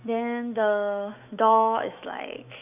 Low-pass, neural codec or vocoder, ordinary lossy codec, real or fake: 3.6 kHz; autoencoder, 48 kHz, 128 numbers a frame, DAC-VAE, trained on Japanese speech; none; fake